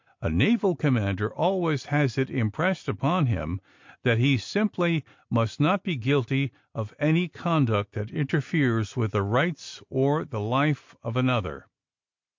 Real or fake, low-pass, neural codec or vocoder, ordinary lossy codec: real; 7.2 kHz; none; MP3, 48 kbps